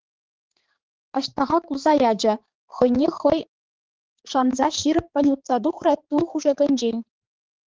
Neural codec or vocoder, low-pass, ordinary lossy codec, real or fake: codec, 16 kHz, 4 kbps, X-Codec, HuBERT features, trained on balanced general audio; 7.2 kHz; Opus, 16 kbps; fake